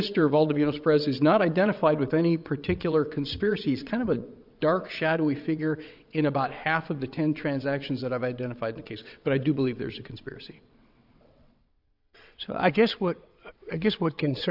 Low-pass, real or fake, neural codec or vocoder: 5.4 kHz; fake; vocoder, 22.05 kHz, 80 mel bands, WaveNeXt